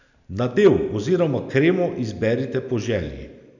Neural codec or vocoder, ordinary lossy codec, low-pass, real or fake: none; none; 7.2 kHz; real